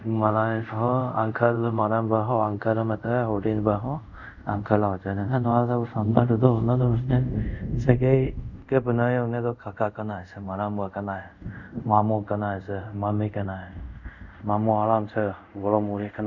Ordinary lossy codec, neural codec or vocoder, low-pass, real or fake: none; codec, 24 kHz, 0.5 kbps, DualCodec; 7.2 kHz; fake